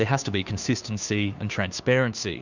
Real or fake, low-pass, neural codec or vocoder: fake; 7.2 kHz; codec, 16 kHz, 2 kbps, FunCodec, trained on Chinese and English, 25 frames a second